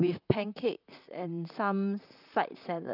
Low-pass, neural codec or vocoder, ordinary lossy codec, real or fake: 5.4 kHz; vocoder, 44.1 kHz, 128 mel bands, Pupu-Vocoder; none; fake